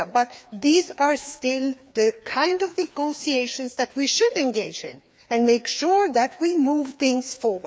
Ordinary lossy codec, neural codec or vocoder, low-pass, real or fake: none; codec, 16 kHz, 2 kbps, FreqCodec, larger model; none; fake